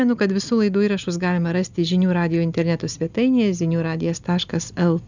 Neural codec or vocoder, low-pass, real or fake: none; 7.2 kHz; real